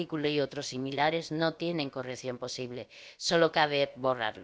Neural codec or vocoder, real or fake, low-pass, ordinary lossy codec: codec, 16 kHz, about 1 kbps, DyCAST, with the encoder's durations; fake; none; none